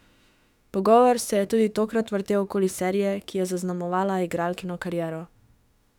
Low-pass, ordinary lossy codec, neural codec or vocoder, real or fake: 19.8 kHz; none; autoencoder, 48 kHz, 32 numbers a frame, DAC-VAE, trained on Japanese speech; fake